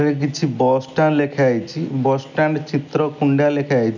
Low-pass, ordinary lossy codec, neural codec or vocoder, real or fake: 7.2 kHz; none; none; real